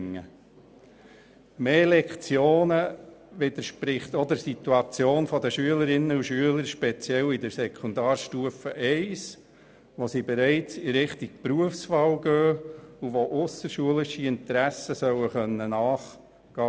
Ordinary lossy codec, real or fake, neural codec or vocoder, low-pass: none; real; none; none